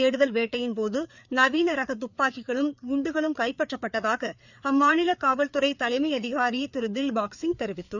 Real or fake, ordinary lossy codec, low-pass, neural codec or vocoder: fake; none; 7.2 kHz; codec, 16 kHz, 4 kbps, FreqCodec, larger model